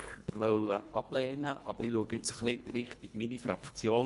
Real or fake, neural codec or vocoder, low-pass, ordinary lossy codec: fake; codec, 24 kHz, 1.5 kbps, HILCodec; 10.8 kHz; MP3, 64 kbps